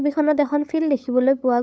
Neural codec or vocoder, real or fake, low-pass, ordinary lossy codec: codec, 16 kHz, 8 kbps, FunCodec, trained on LibriTTS, 25 frames a second; fake; none; none